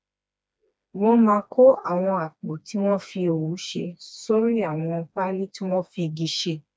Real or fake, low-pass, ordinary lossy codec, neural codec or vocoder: fake; none; none; codec, 16 kHz, 2 kbps, FreqCodec, smaller model